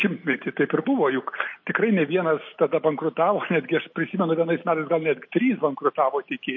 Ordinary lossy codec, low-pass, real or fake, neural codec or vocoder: MP3, 32 kbps; 7.2 kHz; real; none